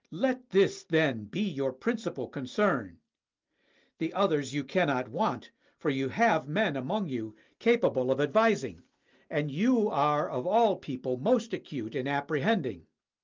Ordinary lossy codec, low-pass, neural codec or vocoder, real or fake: Opus, 32 kbps; 7.2 kHz; none; real